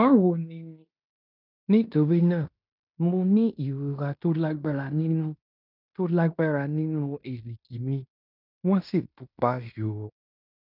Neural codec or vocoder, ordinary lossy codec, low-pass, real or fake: codec, 16 kHz in and 24 kHz out, 0.9 kbps, LongCat-Audio-Codec, fine tuned four codebook decoder; none; 5.4 kHz; fake